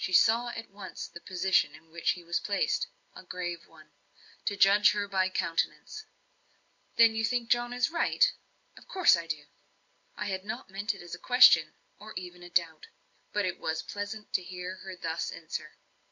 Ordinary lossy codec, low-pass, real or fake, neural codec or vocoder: MP3, 64 kbps; 7.2 kHz; real; none